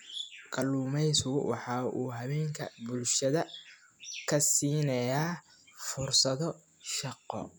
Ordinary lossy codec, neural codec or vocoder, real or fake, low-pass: none; none; real; none